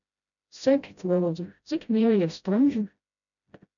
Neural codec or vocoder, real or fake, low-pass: codec, 16 kHz, 0.5 kbps, FreqCodec, smaller model; fake; 7.2 kHz